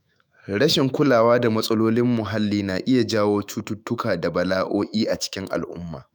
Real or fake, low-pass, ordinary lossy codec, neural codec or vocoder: fake; none; none; autoencoder, 48 kHz, 128 numbers a frame, DAC-VAE, trained on Japanese speech